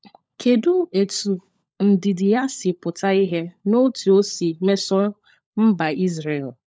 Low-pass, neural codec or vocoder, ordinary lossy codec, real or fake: none; codec, 16 kHz, 16 kbps, FunCodec, trained on LibriTTS, 50 frames a second; none; fake